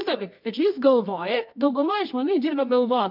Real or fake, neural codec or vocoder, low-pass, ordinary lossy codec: fake; codec, 24 kHz, 0.9 kbps, WavTokenizer, medium music audio release; 5.4 kHz; MP3, 48 kbps